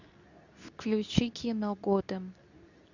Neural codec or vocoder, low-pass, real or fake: codec, 24 kHz, 0.9 kbps, WavTokenizer, medium speech release version 2; 7.2 kHz; fake